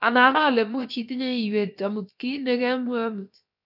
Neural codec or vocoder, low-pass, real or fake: codec, 16 kHz, about 1 kbps, DyCAST, with the encoder's durations; 5.4 kHz; fake